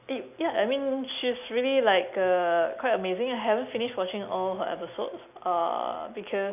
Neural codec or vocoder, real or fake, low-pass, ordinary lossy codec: none; real; 3.6 kHz; none